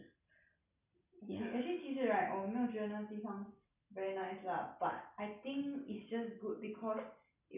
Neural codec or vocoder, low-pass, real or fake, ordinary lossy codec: none; 3.6 kHz; real; none